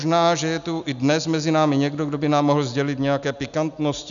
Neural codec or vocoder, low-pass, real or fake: none; 7.2 kHz; real